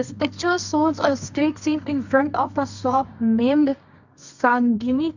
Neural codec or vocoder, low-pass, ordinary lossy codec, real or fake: codec, 24 kHz, 0.9 kbps, WavTokenizer, medium music audio release; 7.2 kHz; none; fake